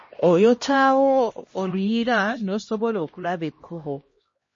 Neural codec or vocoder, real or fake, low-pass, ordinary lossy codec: codec, 16 kHz, 1 kbps, X-Codec, HuBERT features, trained on LibriSpeech; fake; 7.2 kHz; MP3, 32 kbps